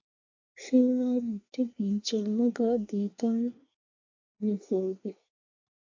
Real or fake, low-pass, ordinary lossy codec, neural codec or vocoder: fake; 7.2 kHz; AAC, 48 kbps; codec, 24 kHz, 1 kbps, SNAC